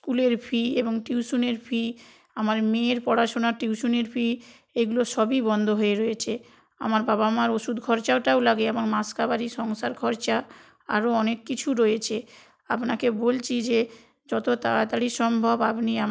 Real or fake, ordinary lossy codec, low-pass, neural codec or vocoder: real; none; none; none